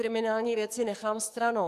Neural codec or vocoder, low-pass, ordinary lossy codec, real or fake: codec, 44.1 kHz, 7.8 kbps, DAC; 14.4 kHz; MP3, 96 kbps; fake